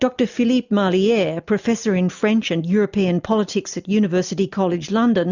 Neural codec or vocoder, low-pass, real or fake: none; 7.2 kHz; real